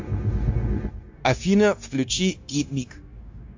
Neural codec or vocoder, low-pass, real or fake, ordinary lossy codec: codec, 16 kHz, 0.9 kbps, LongCat-Audio-Codec; 7.2 kHz; fake; AAC, 48 kbps